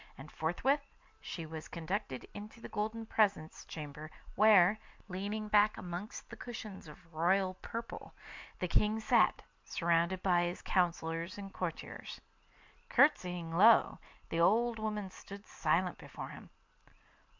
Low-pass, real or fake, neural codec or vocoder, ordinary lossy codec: 7.2 kHz; real; none; MP3, 64 kbps